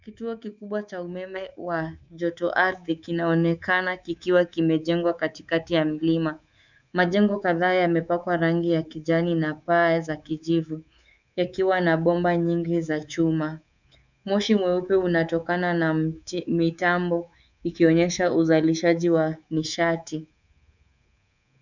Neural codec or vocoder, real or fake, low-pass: codec, 24 kHz, 3.1 kbps, DualCodec; fake; 7.2 kHz